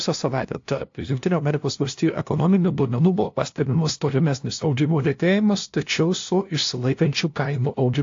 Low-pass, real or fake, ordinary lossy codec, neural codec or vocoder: 7.2 kHz; fake; AAC, 48 kbps; codec, 16 kHz, 0.5 kbps, FunCodec, trained on LibriTTS, 25 frames a second